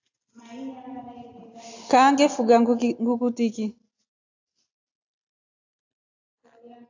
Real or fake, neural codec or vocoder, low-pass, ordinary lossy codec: real; none; 7.2 kHz; AAC, 48 kbps